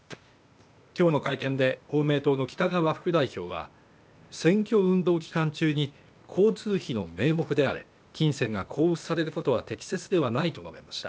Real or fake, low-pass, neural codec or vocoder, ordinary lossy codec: fake; none; codec, 16 kHz, 0.8 kbps, ZipCodec; none